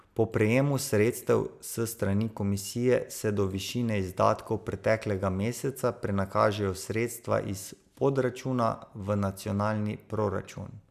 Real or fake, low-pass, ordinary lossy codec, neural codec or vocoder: real; 14.4 kHz; none; none